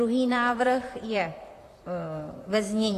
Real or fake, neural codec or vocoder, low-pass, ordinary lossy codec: fake; vocoder, 44.1 kHz, 128 mel bands, Pupu-Vocoder; 14.4 kHz; AAC, 48 kbps